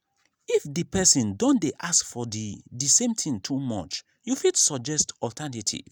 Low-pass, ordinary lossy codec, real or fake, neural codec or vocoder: none; none; real; none